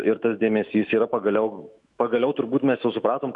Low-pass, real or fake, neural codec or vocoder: 10.8 kHz; fake; autoencoder, 48 kHz, 128 numbers a frame, DAC-VAE, trained on Japanese speech